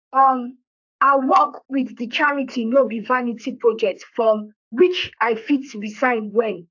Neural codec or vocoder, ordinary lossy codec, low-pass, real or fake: codec, 32 kHz, 1.9 kbps, SNAC; AAC, 48 kbps; 7.2 kHz; fake